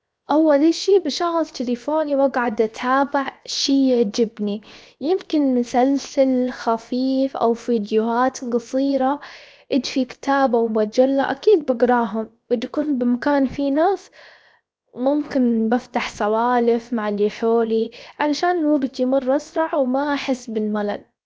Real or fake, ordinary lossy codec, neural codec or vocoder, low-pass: fake; none; codec, 16 kHz, 0.7 kbps, FocalCodec; none